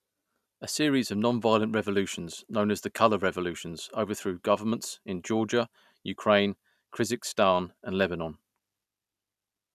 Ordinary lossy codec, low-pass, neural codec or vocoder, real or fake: none; 14.4 kHz; none; real